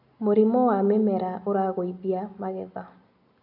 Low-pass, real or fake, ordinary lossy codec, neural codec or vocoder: 5.4 kHz; real; none; none